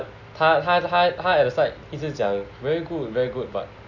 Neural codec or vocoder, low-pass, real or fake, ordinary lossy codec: none; 7.2 kHz; real; none